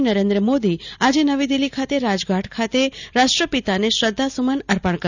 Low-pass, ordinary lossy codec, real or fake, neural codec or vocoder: 7.2 kHz; none; real; none